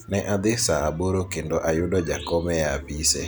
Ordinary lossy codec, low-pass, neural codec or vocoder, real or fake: none; none; none; real